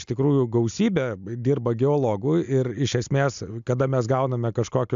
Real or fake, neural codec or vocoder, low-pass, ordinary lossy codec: real; none; 7.2 kHz; AAC, 96 kbps